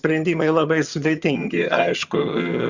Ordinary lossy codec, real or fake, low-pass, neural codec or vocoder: Opus, 64 kbps; fake; 7.2 kHz; vocoder, 22.05 kHz, 80 mel bands, HiFi-GAN